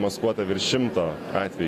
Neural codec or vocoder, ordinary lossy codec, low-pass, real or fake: vocoder, 48 kHz, 128 mel bands, Vocos; AAC, 48 kbps; 14.4 kHz; fake